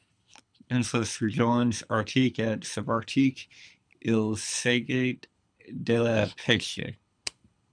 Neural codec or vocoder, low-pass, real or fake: codec, 24 kHz, 6 kbps, HILCodec; 9.9 kHz; fake